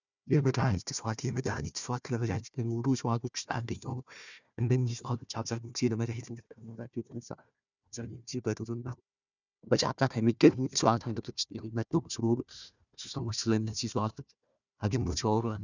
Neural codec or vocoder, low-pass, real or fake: codec, 16 kHz, 1 kbps, FunCodec, trained on Chinese and English, 50 frames a second; 7.2 kHz; fake